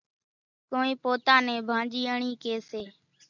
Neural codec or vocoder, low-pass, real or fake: none; 7.2 kHz; real